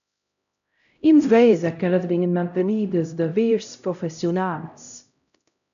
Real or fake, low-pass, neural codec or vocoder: fake; 7.2 kHz; codec, 16 kHz, 0.5 kbps, X-Codec, HuBERT features, trained on LibriSpeech